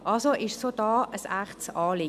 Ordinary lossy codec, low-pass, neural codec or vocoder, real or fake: none; 14.4 kHz; none; real